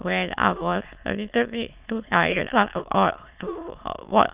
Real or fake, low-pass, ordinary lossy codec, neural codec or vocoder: fake; 3.6 kHz; Opus, 24 kbps; autoencoder, 22.05 kHz, a latent of 192 numbers a frame, VITS, trained on many speakers